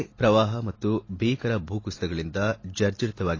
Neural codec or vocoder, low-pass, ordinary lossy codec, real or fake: none; 7.2 kHz; AAC, 32 kbps; real